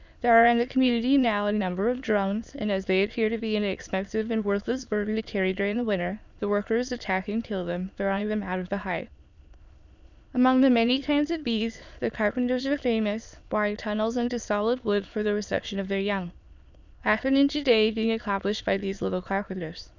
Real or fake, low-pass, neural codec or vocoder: fake; 7.2 kHz; autoencoder, 22.05 kHz, a latent of 192 numbers a frame, VITS, trained on many speakers